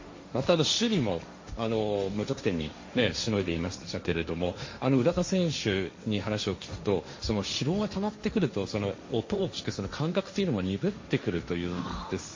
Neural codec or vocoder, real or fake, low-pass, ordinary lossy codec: codec, 16 kHz, 1.1 kbps, Voila-Tokenizer; fake; 7.2 kHz; MP3, 32 kbps